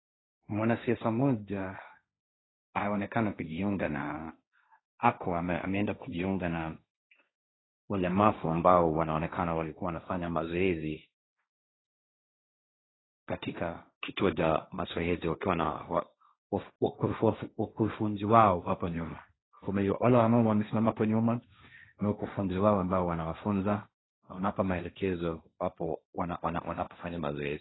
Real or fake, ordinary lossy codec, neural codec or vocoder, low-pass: fake; AAC, 16 kbps; codec, 16 kHz, 1.1 kbps, Voila-Tokenizer; 7.2 kHz